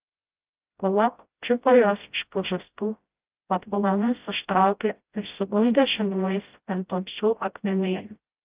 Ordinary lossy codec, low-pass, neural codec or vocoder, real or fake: Opus, 32 kbps; 3.6 kHz; codec, 16 kHz, 0.5 kbps, FreqCodec, smaller model; fake